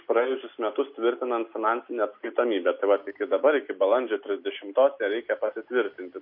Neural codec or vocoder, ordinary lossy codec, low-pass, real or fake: none; MP3, 32 kbps; 5.4 kHz; real